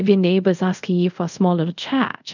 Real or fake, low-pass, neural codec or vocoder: fake; 7.2 kHz; codec, 24 kHz, 0.5 kbps, DualCodec